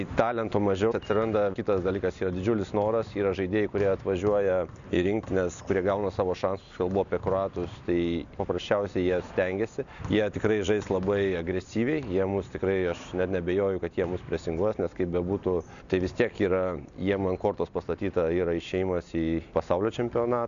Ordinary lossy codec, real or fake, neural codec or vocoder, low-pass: MP3, 96 kbps; real; none; 7.2 kHz